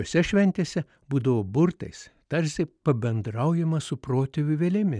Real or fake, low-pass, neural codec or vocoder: real; 9.9 kHz; none